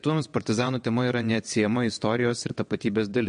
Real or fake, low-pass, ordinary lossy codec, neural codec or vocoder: fake; 9.9 kHz; MP3, 48 kbps; vocoder, 22.05 kHz, 80 mel bands, WaveNeXt